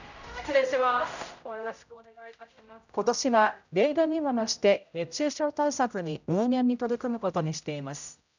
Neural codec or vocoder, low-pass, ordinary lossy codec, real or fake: codec, 16 kHz, 0.5 kbps, X-Codec, HuBERT features, trained on general audio; 7.2 kHz; none; fake